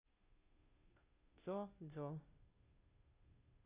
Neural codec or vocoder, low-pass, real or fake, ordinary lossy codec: codec, 16 kHz, 0.5 kbps, FunCodec, trained on Chinese and English, 25 frames a second; 3.6 kHz; fake; Opus, 64 kbps